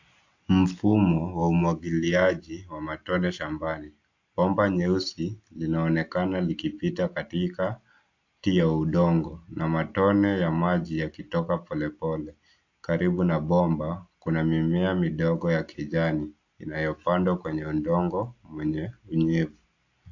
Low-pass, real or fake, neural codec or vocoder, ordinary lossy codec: 7.2 kHz; real; none; AAC, 48 kbps